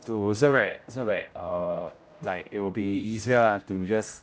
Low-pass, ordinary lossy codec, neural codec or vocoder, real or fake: none; none; codec, 16 kHz, 0.5 kbps, X-Codec, HuBERT features, trained on general audio; fake